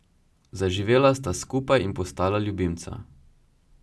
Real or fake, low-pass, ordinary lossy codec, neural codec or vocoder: real; none; none; none